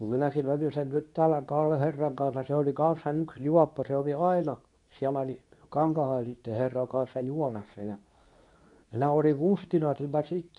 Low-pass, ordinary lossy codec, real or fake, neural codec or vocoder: 10.8 kHz; none; fake; codec, 24 kHz, 0.9 kbps, WavTokenizer, medium speech release version 2